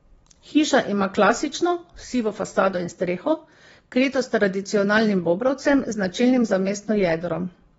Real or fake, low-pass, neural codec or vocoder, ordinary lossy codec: fake; 9.9 kHz; vocoder, 22.05 kHz, 80 mel bands, WaveNeXt; AAC, 24 kbps